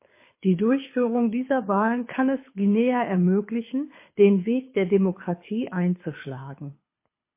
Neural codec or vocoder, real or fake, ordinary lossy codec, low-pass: codec, 24 kHz, 6 kbps, HILCodec; fake; MP3, 24 kbps; 3.6 kHz